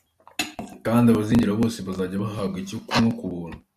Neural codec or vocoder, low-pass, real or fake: none; 14.4 kHz; real